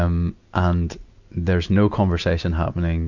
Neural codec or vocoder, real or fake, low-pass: none; real; 7.2 kHz